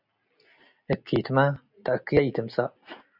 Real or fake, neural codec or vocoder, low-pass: real; none; 5.4 kHz